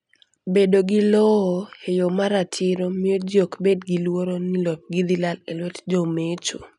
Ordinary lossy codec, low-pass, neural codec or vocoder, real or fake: none; 10.8 kHz; none; real